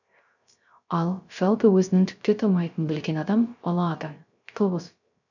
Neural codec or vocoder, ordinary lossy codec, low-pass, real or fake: codec, 16 kHz, 0.3 kbps, FocalCodec; none; 7.2 kHz; fake